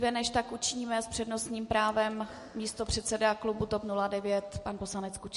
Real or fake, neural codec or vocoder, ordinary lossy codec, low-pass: real; none; MP3, 48 kbps; 14.4 kHz